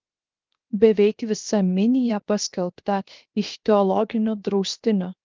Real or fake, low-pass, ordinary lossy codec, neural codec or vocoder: fake; 7.2 kHz; Opus, 24 kbps; codec, 16 kHz, 0.7 kbps, FocalCodec